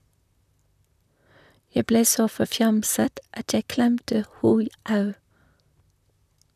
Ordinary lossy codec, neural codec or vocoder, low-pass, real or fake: none; vocoder, 44.1 kHz, 128 mel bands, Pupu-Vocoder; 14.4 kHz; fake